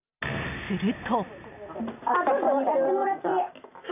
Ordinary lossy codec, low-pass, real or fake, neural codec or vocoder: none; 3.6 kHz; real; none